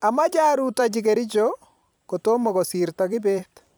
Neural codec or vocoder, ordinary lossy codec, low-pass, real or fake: vocoder, 44.1 kHz, 128 mel bands every 256 samples, BigVGAN v2; none; none; fake